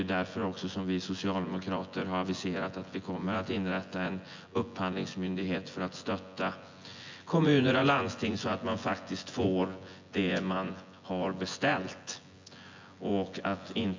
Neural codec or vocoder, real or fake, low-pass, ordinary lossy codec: vocoder, 24 kHz, 100 mel bands, Vocos; fake; 7.2 kHz; MP3, 64 kbps